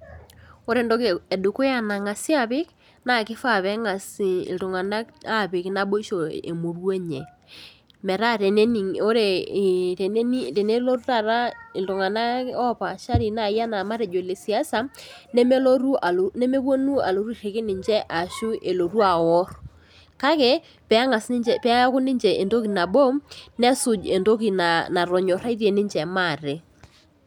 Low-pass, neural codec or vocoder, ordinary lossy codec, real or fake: 19.8 kHz; none; none; real